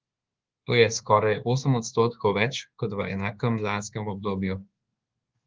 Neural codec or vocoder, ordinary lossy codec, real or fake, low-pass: codec, 24 kHz, 1.2 kbps, DualCodec; Opus, 16 kbps; fake; 7.2 kHz